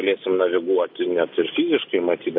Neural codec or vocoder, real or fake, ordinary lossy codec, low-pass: none; real; MP3, 32 kbps; 5.4 kHz